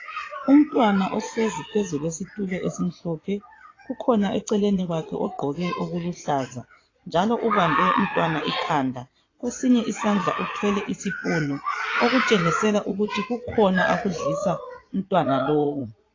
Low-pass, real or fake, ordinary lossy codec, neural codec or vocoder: 7.2 kHz; fake; AAC, 32 kbps; vocoder, 22.05 kHz, 80 mel bands, Vocos